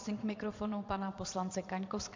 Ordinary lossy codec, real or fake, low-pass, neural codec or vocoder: MP3, 64 kbps; fake; 7.2 kHz; vocoder, 22.05 kHz, 80 mel bands, Vocos